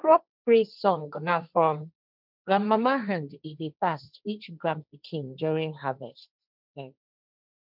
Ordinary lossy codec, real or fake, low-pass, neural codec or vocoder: none; fake; 5.4 kHz; codec, 16 kHz, 1.1 kbps, Voila-Tokenizer